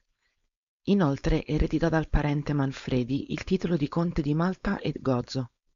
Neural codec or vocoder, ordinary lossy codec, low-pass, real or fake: codec, 16 kHz, 4.8 kbps, FACodec; MP3, 64 kbps; 7.2 kHz; fake